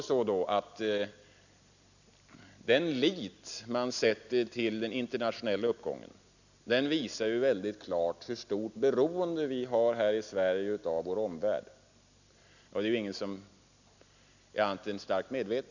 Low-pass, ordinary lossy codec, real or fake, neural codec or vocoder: 7.2 kHz; none; real; none